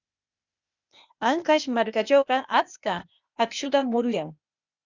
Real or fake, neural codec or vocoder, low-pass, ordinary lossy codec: fake; codec, 16 kHz, 0.8 kbps, ZipCodec; 7.2 kHz; Opus, 64 kbps